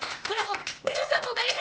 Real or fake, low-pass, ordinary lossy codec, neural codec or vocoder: fake; none; none; codec, 16 kHz, 0.8 kbps, ZipCodec